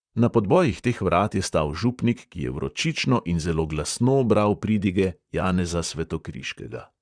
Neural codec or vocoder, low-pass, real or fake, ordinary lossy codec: vocoder, 44.1 kHz, 128 mel bands, Pupu-Vocoder; 9.9 kHz; fake; Opus, 64 kbps